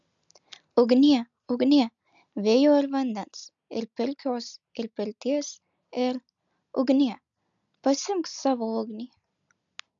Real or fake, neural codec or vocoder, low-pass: real; none; 7.2 kHz